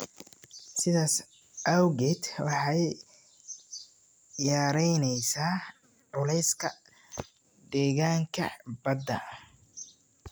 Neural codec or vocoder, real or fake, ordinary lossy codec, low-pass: none; real; none; none